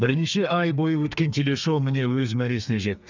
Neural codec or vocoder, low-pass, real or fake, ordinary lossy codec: codec, 32 kHz, 1.9 kbps, SNAC; 7.2 kHz; fake; none